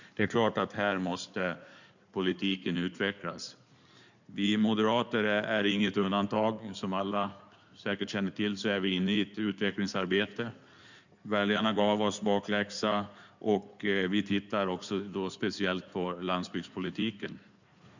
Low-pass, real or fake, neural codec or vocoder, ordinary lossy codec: 7.2 kHz; fake; codec, 16 kHz in and 24 kHz out, 2.2 kbps, FireRedTTS-2 codec; none